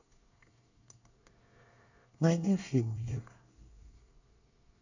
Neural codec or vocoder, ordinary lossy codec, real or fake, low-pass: codec, 24 kHz, 1 kbps, SNAC; none; fake; 7.2 kHz